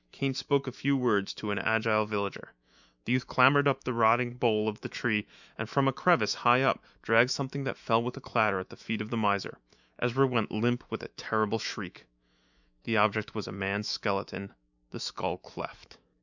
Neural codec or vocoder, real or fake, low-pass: autoencoder, 48 kHz, 128 numbers a frame, DAC-VAE, trained on Japanese speech; fake; 7.2 kHz